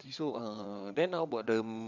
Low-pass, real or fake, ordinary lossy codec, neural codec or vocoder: 7.2 kHz; fake; none; vocoder, 22.05 kHz, 80 mel bands, WaveNeXt